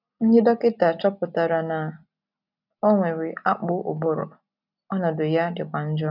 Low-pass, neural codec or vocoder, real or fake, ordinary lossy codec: 5.4 kHz; none; real; none